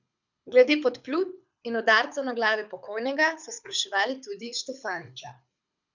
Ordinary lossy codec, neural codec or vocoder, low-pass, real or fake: none; codec, 24 kHz, 6 kbps, HILCodec; 7.2 kHz; fake